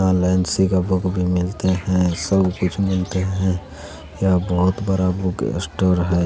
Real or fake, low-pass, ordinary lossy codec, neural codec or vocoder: real; none; none; none